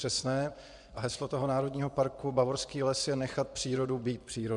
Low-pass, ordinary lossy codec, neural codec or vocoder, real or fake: 10.8 kHz; MP3, 96 kbps; vocoder, 24 kHz, 100 mel bands, Vocos; fake